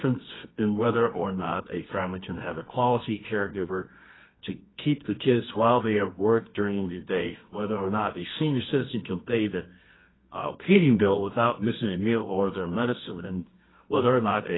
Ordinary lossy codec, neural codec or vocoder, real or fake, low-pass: AAC, 16 kbps; codec, 24 kHz, 0.9 kbps, WavTokenizer, medium music audio release; fake; 7.2 kHz